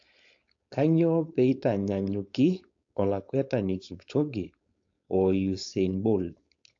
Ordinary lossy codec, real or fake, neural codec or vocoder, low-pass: AAC, 48 kbps; fake; codec, 16 kHz, 4.8 kbps, FACodec; 7.2 kHz